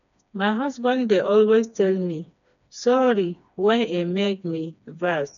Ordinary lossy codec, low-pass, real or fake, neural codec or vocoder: none; 7.2 kHz; fake; codec, 16 kHz, 2 kbps, FreqCodec, smaller model